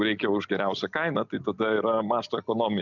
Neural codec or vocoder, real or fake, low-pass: none; real; 7.2 kHz